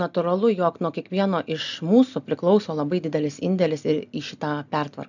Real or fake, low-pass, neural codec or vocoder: real; 7.2 kHz; none